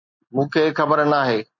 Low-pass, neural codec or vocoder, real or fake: 7.2 kHz; none; real